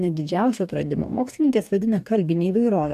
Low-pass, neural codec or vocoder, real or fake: 14.4 kHz; codec, 44.1 kHz, 2.6 kbps, DAC; fake